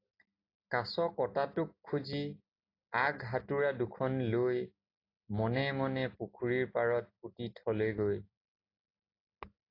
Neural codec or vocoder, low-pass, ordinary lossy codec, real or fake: none; 5.4 kHz; AAC, 32 kbps; real